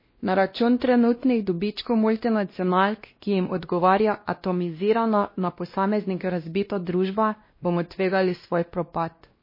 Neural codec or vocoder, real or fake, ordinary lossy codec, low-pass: codec, 16 kHz, 1 kbps, X-Codec, WavLM features, trained on Multilingual LibriSpeech; fake; MP3, 24 kbps; 5.4 kHz